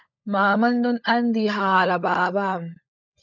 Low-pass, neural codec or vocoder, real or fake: 7.2 kHz; codec, 16 kHz, 16 kbps, FunCodec, trained on LibriTTS, 50 frames a second; fake